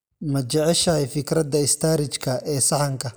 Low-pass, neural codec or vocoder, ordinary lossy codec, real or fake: none; none; none; real